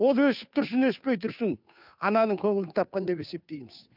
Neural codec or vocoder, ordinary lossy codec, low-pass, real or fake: codec, 16 kHz, 2 kbps, FunCodec, trained on Chinese and English, 25 frames a second; none; 5.4 kHz; fake